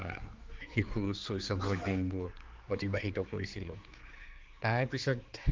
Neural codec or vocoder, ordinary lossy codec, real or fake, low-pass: codec, 16 kHz, 4 kbps, X-Codec, HuBERT features, trained on general audio; Opus, 32 kbps; fake; 7.2 kHz